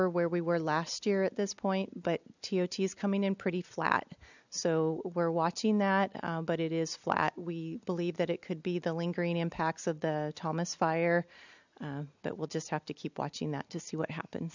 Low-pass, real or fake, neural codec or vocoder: 7.2 kHz; real; none